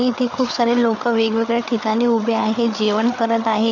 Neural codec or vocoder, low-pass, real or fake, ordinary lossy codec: codec, 16 kHz, 8 kbps, FreqCodec, larger model; 7.2 kHz; fake; none